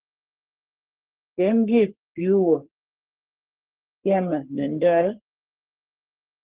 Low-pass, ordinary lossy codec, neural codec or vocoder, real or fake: 3.6 kHz; Opus, 16 kbps; codec, 44.1 kHz, 3.4 kbps, Pupu-Codec; fake